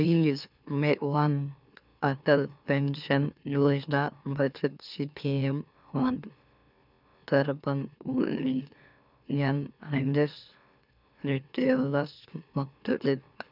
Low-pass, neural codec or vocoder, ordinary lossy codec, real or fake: 5.4 kHz; autoencoder, 44.1 kHz, a latent of 192 numbers a frame, MeloTTS; none; fake